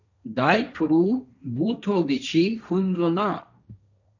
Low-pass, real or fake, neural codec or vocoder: 7.2 kHz; fake; codec, 16 kHz, 1.1 kbps, Voila-Tokenizer